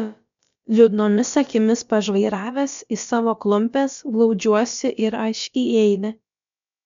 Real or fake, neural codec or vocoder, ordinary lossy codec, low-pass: fake; codec, 16 kHz, about 1 kbps, DyCAST, with the encoder's durations; MP3, 64 kbps; 7.2 kHz